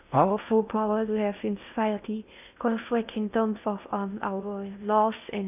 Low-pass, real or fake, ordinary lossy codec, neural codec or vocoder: 3.6 kHz; fake; none; codec, 16 kHz in and 24 kHz out, 0.8 kbps, FocalCodec, streaming, 65536 codes